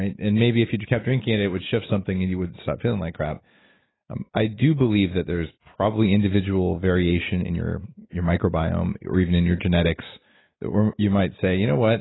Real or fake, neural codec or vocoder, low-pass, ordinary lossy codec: real; none; 7.2 kHz; AAC, 16 kbps